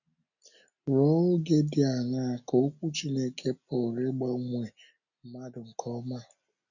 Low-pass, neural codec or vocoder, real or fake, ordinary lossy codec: 7.2 kHz; none; real; none